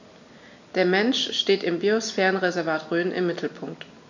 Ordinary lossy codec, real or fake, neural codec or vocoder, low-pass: none; real; none; 7.2 kHz